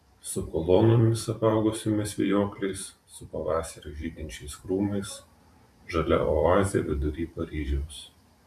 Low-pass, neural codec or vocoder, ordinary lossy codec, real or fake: 14.4 kHz; vocoder, 44.1 kHz, 128 mel bands every 512 samples, BigVGAN v2; AAC, 96 kbps; fake